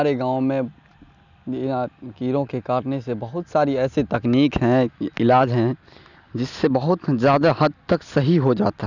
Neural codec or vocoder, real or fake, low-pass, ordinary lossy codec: none; real; 7.2 kHz; none